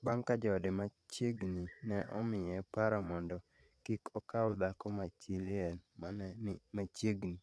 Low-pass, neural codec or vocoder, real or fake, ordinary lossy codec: none; vocoder, 22.05 kHz, 80 mel bands, Vocos; fake; none